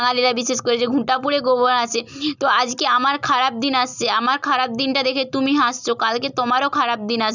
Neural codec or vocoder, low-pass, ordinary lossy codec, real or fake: none; 7.2 kHz; none; real